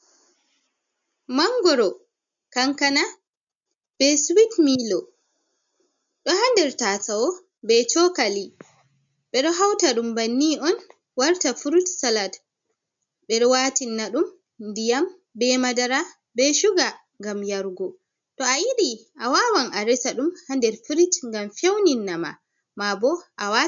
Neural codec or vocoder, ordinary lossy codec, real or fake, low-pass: none; MP3, 64 kbps; real; 7.2 kHz